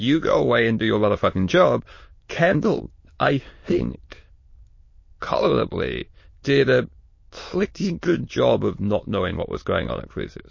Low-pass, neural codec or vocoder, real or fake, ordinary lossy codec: 7.2 kHz; autoencoder, 22.05 kHz, a latent of 192 numbers a frame, VITS, trained on many speakers; fake; MP3, 32 kbps